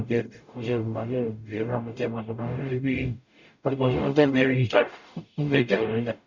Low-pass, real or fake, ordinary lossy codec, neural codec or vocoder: 7.2 kHz; fake; none; codec, 44.1 kHz, 0.9 kbps, DAC